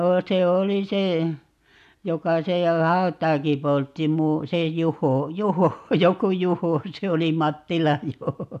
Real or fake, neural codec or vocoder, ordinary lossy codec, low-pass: real; none; AAC, 96 kbps; 14.4 kHz